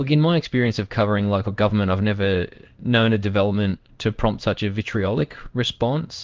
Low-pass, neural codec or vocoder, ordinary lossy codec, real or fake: 7.2 kHz; codec, 16 kHz in and 24 kHz out, 1 kbps, XY-Tokenizer; Opus, 32 kbps; fake